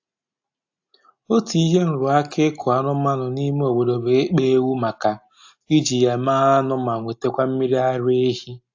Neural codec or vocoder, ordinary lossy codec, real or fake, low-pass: none; AAC, 48 kbps; real; 7.2 kHz